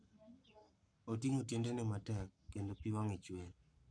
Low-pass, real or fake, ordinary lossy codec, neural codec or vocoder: 9.9 kHz; fake; none; codec, 44.1 kHz, 7.8 kbps, Pupu-Codec